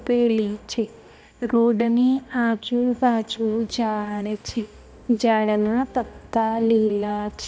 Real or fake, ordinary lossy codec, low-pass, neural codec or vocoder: fake; none; none; codec, 16 kHz, 1 kbps, X-Codec, HuBERT features, trained on balanced general audio